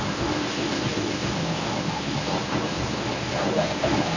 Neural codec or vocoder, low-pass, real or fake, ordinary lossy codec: codec, 24 kHz, 0.9 kbps, WavTokenizer, medium speech release version 1; 7.2 kHz; fake; none